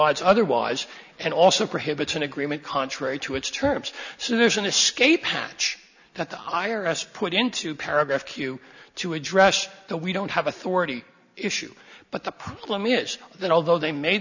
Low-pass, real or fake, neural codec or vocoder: 7.2 kHz; real; none